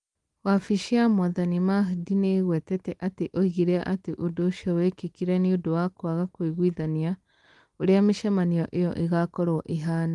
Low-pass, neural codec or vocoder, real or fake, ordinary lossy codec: 10.8 kHz; none; real; Opus, 24 kbps